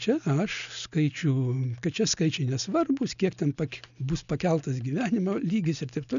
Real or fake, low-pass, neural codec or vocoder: real; 7.2 kHz; none